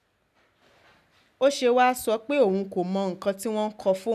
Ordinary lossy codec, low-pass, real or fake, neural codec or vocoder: none; 14.4 kHz; real; none